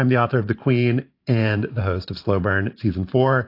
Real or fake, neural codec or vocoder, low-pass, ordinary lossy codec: fake; vocoder, 44.1 kHz, 128 mel bands every 512 samples, BigVGAN v2; 5.4 kHz; AAC, 32 kbps